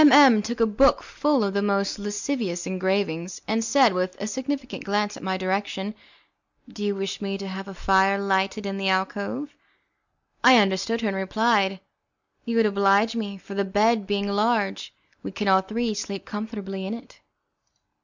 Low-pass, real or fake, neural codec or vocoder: 7.2 kHz; real; none